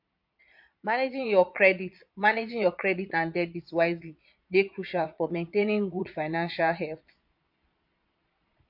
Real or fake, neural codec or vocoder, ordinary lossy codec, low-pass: fake; vocoder, 22.05 kHz, 80 mel bands, Vocos; MP3, 48 kbps; 5.4 kHz